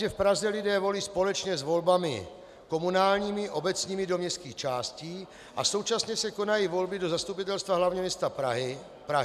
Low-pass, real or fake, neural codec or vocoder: 14.4 kHz; real; none